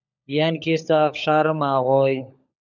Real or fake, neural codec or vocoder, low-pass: fake; codec, 16 kHz, 16 kbps, FunCodec, trained on LibriTTS, 50 frames a second; 7.2 kHz